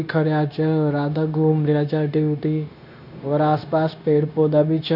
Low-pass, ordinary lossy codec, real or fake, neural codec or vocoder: 5.4 kHz; none; fake; codec, 16 kHz, 0.9 kbps, LongCat-Audio-Codec